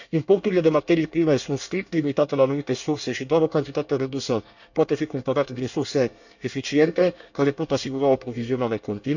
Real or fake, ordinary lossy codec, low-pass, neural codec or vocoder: fake; none; 7.2 kHz; codec, 24 kHz, 1 kbps, SNAC